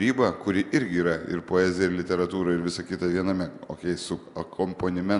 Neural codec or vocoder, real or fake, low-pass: none; real; 10.8 kHz